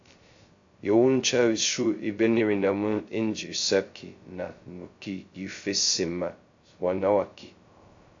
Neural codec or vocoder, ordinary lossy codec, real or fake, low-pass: codec, 16 kHz, 0.2 kbps, FocalCodec; AAC, 48 kbps; fake; 7.2 kHz